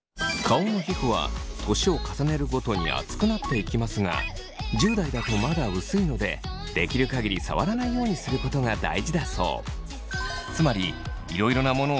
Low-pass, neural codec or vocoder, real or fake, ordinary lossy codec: none; none; real; none